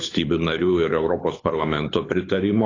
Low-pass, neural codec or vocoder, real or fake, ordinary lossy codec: 7.2 kHz; codec, 24 kHz, 6 kbps, HILCodec; fake; AAC, 32 kbps